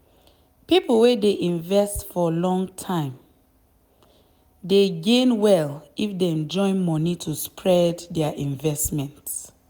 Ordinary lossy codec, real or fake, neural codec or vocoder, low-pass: none; real; none; none